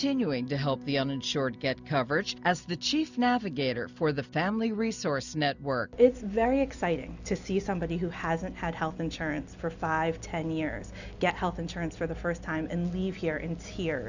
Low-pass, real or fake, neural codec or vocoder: 7.2 kHz; real; none